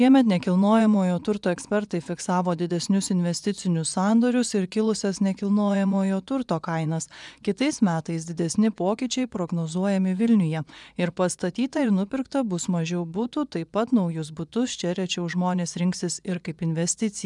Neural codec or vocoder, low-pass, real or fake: vocoder, 24 kHz, 100 mel bands, Vocos; 10.8 kHz; fake